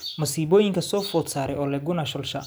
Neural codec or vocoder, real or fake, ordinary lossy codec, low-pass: none; real; none; none